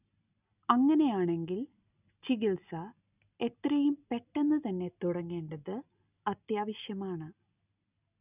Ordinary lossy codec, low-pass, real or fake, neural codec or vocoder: none; 3.6 kHz; real; none